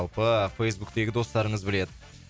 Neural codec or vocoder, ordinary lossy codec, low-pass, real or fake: none; none; none; real